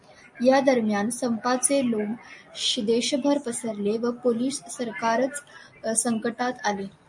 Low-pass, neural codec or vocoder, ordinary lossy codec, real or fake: 10.8 kHz; none; MP3, 48 kbps; real